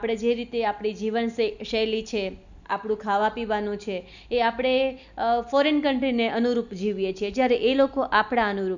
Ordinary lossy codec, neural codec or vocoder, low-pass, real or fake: none; none; 7.2 kHz; real